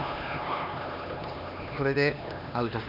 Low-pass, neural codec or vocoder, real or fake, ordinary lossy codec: 5.4 kHz; codec, 16 kHz, 2 kbps, X-Codec, HuBERT features, trained on LibriSpeech; fake; none